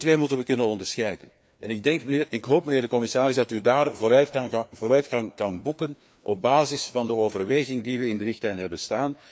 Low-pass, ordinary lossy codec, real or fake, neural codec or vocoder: none; none; fake; codec, 16 kHz, 2 kbps, FreqCodec, larger model